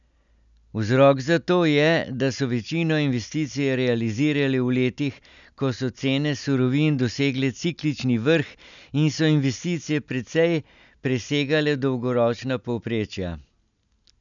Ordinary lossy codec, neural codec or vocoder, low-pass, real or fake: none; none; 7.2 kHz; real